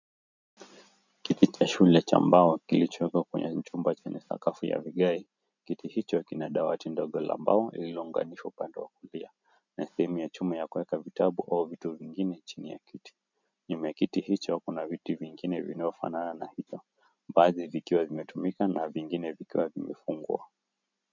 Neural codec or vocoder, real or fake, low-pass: none; real; 7.2 kHz